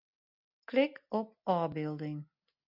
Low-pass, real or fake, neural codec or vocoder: 5.4 kHz; real; none